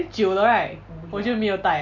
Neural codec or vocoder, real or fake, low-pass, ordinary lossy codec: none; real; 7.2 kHz; none